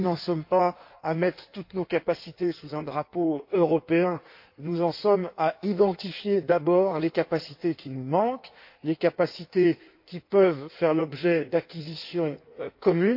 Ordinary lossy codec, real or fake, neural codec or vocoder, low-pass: MP3, 32 kbps; fake; codec, 16 kHz in and 24 kHz out, 1.1 kbps, FireRedTTS-2 codec; 5.4 kHz